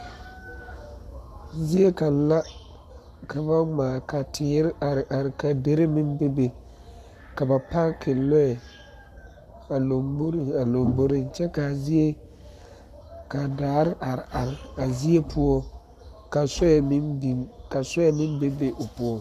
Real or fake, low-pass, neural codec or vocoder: fake; 14.4 kHz; codec, 44.1 kHz, 7.8 kbps, Pupu-Codec